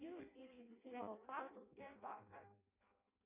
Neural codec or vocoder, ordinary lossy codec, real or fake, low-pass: codec, 16 kHz in and 24 kHz out, 0.6 kbps, FireRedTTS-2 codec; AAC, 32 kbps; fake; 3.6 kHz